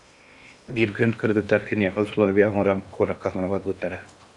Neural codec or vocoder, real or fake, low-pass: codec, 16 kHz in and 24 kHz out, 0.8 kbps, FocalCodec, streaming, 65536 codes; fake; 10.8 kHz